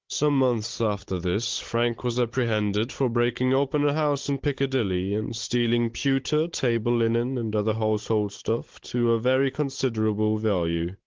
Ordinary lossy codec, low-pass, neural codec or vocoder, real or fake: Opus, 16 kbps; 7.2 kHz; codec, 16 kHz, 16 kbps, FunCodec, trained on Chinese and English, 50 frames a second; fake